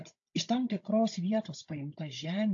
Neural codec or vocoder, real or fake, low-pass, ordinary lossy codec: codec, 16 kHz, 16 kbps, FunCodec, trained on Chinese and English, 50 frames a second; fake; 7.2 kHz; AAC, 48 kbps